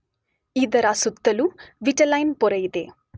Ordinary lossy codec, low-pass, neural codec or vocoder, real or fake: none; none; none; real